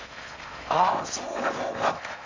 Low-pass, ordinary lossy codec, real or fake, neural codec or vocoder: 7.2 kHz; MP3, 64 kbps; fake; codec, 16 kHz in and 24 kHz out, 0.6 kbps, FocalCodec, streaming, 4096 codes